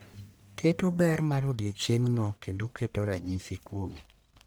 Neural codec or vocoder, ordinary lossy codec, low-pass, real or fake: codec, 44.1 kHz, 1.7 kbps, Pupu-Codec; none; none; fake